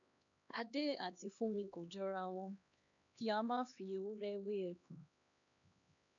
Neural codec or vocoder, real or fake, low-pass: codec, 16 kHz, 2 kbps, X-Codec, HuBERT features, trained on LibriSpeech; fake; 7.2 kHz